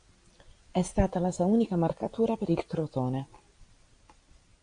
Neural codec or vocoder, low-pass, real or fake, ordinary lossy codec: none; 9.9 kHz; real; AAC, 64 kbps